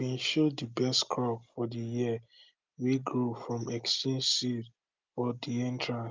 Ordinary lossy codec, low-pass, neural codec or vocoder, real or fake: Opus, 24 kbps; 7.2 kHz; none; real